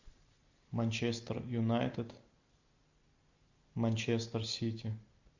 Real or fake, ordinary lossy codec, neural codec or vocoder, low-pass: real; AAC, 48 kbps; none; 7.2 kHz